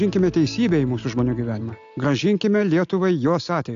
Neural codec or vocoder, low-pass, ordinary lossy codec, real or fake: none; 7.2 kHz; AAC, 64 kbps; real